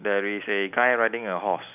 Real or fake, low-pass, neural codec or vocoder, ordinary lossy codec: real; 3.6 kHz; none; none